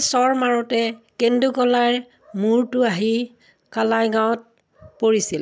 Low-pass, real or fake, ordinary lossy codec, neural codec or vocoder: none; real; none; none